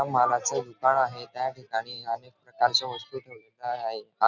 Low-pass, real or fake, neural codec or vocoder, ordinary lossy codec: 7.2 kHz; real; none; none